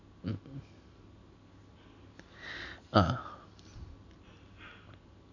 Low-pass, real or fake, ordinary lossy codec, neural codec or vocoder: 7.2 kHz; real; none; none